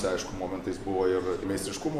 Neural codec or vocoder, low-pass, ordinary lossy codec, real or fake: none; 14.4 kHz; AAC, 96 kbps; real